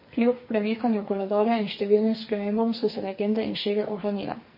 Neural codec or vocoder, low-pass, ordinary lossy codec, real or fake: codec, 44.1 kHz, 2.6 kbps, SNAC; 5.4 kHz; MP3, 24 kbps; fake